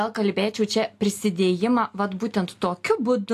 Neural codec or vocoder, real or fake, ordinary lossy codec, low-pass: none; real; AAC, 64 kbps; 14.4 kHz